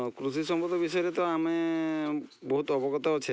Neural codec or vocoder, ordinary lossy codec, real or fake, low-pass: none; none; real; none